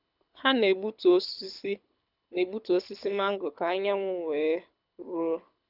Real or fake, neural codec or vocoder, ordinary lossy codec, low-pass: fake; codec, 24 kHz, 6 kbps, HILCodec; none; 5.4 kHz